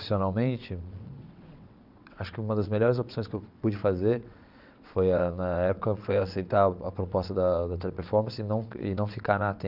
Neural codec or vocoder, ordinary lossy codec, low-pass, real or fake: vocoder, 22.05 kHz, 80 mel bands, WaveNeXt; none; 5.4 kHz; fake